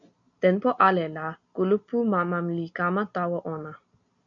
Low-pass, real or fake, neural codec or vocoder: 7.2 kHz; real; none